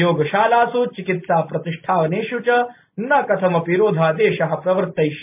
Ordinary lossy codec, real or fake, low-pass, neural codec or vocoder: MP3, 32 kbps; real; 3.6 kHz; none